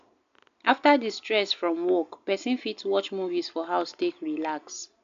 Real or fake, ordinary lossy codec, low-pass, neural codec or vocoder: real; AAC, 64 kbps; 7.2 kHz; none